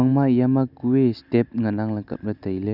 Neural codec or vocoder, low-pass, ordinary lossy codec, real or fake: none; 5.4 kHz; none; real